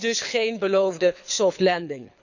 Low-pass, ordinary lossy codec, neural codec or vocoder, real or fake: 7.2 kHz; none; codec, 24 kHz, 6 kbps, HILCodec; fake